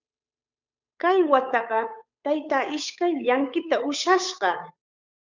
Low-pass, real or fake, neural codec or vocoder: 7.2 kHz; fake; codec, 16 kHz, 8 kbps, FunCodec, trained on Chinese and English, 25 frames a second